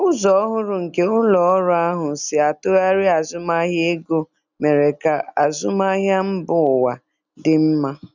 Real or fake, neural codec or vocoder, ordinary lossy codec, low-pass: real; none; none; 7.2 kHz